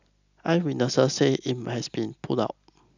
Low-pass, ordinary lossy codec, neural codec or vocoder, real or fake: 7.2 kHz; none; none; real